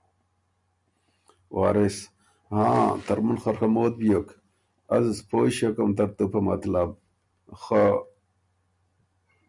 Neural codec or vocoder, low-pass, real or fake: none; 10.8 kHz; real